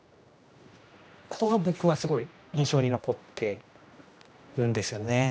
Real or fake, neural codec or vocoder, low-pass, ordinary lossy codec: fake; codec, 16 kHz, 1 kbps, X-Codec, HuBERT features, trained on general audio; none; none